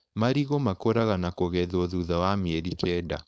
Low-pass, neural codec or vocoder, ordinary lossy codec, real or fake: none; codec, 16 kHz, 4.8 kbps, FACodec; none; fake